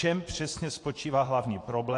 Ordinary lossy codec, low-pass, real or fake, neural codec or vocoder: AAC, 48 kbps; 10.8 kHz; fake; vocoder, 24 kHz, 100 mel bands, Vocos